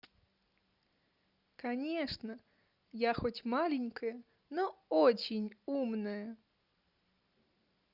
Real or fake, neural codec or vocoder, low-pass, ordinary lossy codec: real; none; 5.4 kHz; Opus, 64 kbps